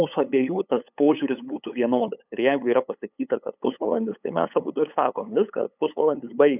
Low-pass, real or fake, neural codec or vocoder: 3.6 kHz; fake; codec, 16 kHz, 8 kbps, FunCodec, trained on LibriTTS, 25 frames a second